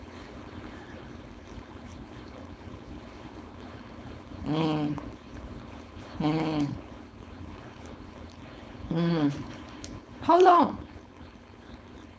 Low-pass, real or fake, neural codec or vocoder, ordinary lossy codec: none; fake; codec, 16 kHz, 4.8 kbps, FACodec; none